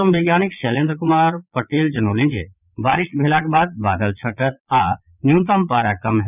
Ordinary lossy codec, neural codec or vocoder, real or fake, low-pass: none; vocoder, 22.05 kHz, 80 mel bands, Vocos; fake; 3.6 kHz